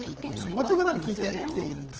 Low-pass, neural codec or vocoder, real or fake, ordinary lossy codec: 7.2 kHz; codec, 16 kHz, 8 kbps, FunCodec, trained on LibriTTS, 25 frames a second; fake; Opus, 16 kbps